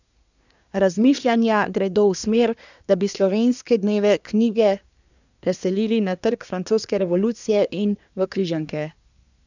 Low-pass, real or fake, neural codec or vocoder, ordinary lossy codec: 7.2 kHz; fake; codec, 24 kHz, 1 kbps, SNAC; none